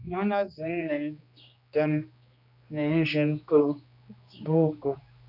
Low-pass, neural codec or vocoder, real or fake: 5.4 kHz; codec, 16 kHz, 2 kbps, X-Codec, HuBERT features, trained on general audio; fake